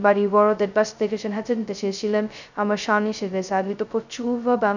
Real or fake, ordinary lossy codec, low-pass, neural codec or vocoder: fake; none; 7.2 kHz; codec, 16 kHz, 0.2 kbps, FocalCodec